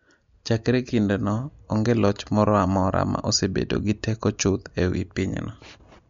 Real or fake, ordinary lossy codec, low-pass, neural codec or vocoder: real; MP3, 48 kbps; 7.2 kHz; none